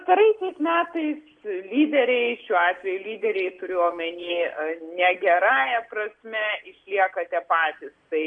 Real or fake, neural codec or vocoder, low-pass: real; none; 9.9 kHz